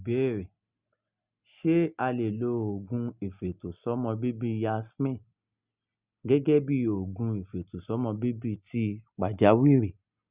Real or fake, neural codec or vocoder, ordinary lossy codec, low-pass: real; none; Opus, 64 kbps; 3.6 kHz